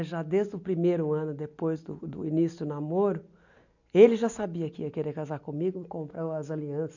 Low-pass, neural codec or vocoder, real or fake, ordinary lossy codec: 7.2 kHz; none; real; none